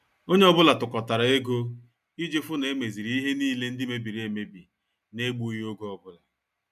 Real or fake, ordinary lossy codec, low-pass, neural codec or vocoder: real; none; 14.4 kHz; none